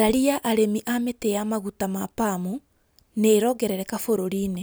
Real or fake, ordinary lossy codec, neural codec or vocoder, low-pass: real; none; none; none